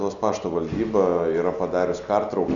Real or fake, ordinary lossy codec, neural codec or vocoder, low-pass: real; Opus, 64 kbps; none; 7.2 kHz